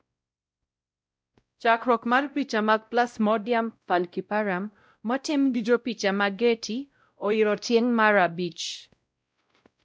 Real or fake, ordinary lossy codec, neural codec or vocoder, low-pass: fake; none; codec, 16 kHz, 0.5 kbps, X-Codec, WavLM features, trained on Multilingual LibriSpeech; none